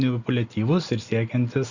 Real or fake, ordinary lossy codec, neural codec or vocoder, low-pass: real; Opus, 64 kbps; none; 7.2 kHz